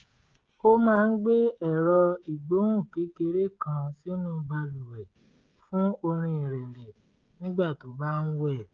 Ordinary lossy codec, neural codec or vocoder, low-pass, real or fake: Opus, 24 kbps; codec, 16 kHz, 16 kbps, FreqCodec, smaller model; 7.2 kHz; fake